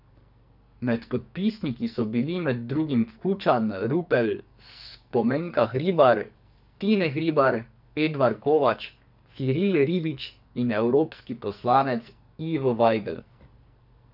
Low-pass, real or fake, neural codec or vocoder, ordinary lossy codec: 5.4 kHz; fake; codec, 44.1 kHz, 2.6 kbps, SNAC; none